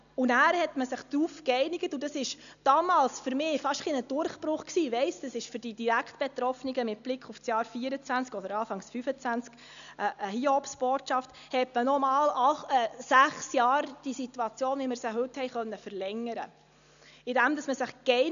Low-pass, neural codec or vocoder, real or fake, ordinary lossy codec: 7.2 kHz; none; real; none